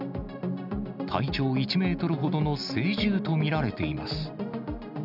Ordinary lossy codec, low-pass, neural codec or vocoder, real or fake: none; 5.4 kHz; none; real